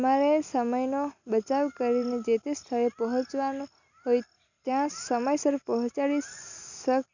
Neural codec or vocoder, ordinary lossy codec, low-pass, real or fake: none; none; 7.2 kHz; real